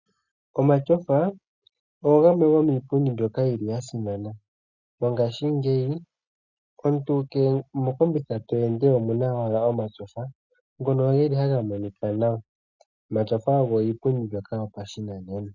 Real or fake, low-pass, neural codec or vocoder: real; 7.2 kHz; none